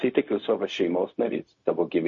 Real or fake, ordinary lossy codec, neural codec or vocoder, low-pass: fake; MP3, 32 kbps; codec, 16 kHz, 0.4 kbps, LongCat-Audio-Codec; 7.2 kHz